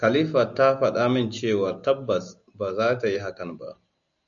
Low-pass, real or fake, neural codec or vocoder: 7.2 kHz; real; none